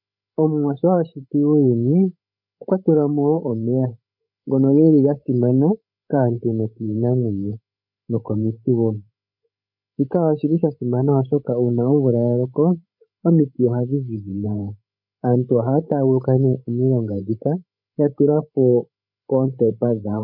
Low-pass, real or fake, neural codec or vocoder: 5.4 kHz; fake; codec, 16 kHz, 16 kbps, FreqCodec, larger model